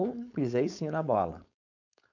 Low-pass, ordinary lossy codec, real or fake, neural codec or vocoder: 7.2 kHz; none; fake; codec, 16 kHz, 4.8 kbps, FACodec